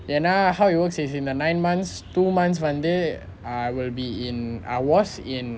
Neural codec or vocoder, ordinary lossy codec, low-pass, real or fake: none; none; none; real